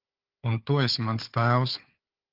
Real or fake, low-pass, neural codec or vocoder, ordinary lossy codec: fake; 5.4 kHz; codec, 16 kHz, 4 kbps, FunCodec, trained on Chinese and English, 50 frames a second; Opus, 32 kbps